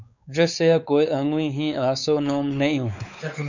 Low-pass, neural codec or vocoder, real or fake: 7.2 kHz; codec, 16 kHz, 4 kbps, X-Codec, WavLM features, trained on Multilingual LibriSpeech; fake